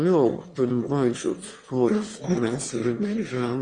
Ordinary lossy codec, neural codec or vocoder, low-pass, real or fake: Opus, 32 kbps; autoencoder, 22.05 kHz, a latent of 192 numbers a frame, VITS, trained on one speaker; 9.9 kHz; fake